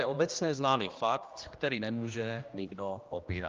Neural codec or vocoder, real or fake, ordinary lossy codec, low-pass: codec, 16 kHz, 1 kbps, X-Codec, HuBERT features, trained on general audio; fake; Opus, 32 kbps; 7.2 kHz